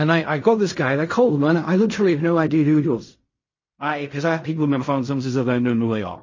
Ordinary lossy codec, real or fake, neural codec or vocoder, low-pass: MP3, 32 kbps; fake; codec, 16 kHz in and 24 kHz out, 0.4 kbps, LongCat-Audio-Codec, fine tuned four codebook decoder; 7.2 kHz